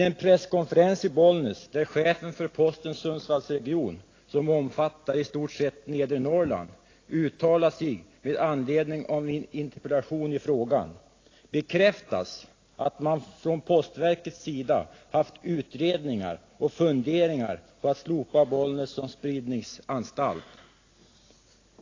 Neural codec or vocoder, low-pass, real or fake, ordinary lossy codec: none; 7.2 kHz; real; AAC, 32 kbps